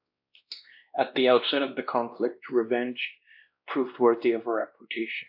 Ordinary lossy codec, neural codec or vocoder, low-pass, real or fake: AAC, 48 kbps; codec, 16 kHz, 1 kbps, X-Codec, WavLM features, trained on Multilingual LibriSpeech; 5.4 kHz; fake